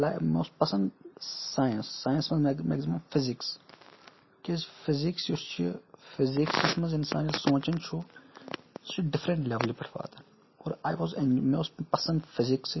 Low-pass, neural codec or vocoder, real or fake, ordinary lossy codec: 7.2 kHz; none; real; MP3, 24 kbps